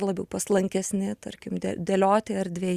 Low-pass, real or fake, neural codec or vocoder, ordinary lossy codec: 14.4 kHz; real; none; Opus, 64 kbps